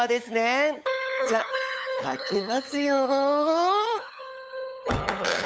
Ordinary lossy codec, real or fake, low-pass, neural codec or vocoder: none; fake; none; codec, 16 kHz, 8 kbps, FunCodec, trained on LibriTTS, 25 frames a second